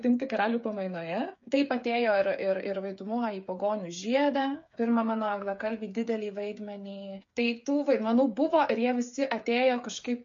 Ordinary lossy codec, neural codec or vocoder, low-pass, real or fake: MP3, 48 kbps; codec, 16 kHz, 8 kbps, FreqCodec, smaller model; 7.2 kHz; fake